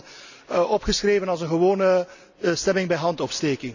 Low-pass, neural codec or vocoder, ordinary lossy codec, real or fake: 7.2 kHz; none; none; real